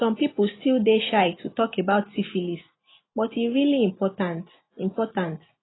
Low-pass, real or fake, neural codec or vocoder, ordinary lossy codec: 7.2 kHz; real; none; AAC, 16 kbps